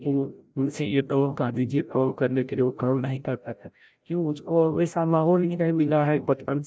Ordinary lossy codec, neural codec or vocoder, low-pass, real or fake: none; codec, 16 kHz, 0.5 kbps, FreqCodec, larger model; none; fake